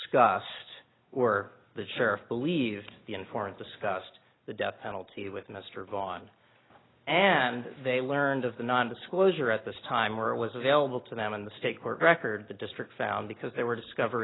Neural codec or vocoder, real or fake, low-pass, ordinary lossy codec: none; real; 7.2 kHz; AAC, 16 kbps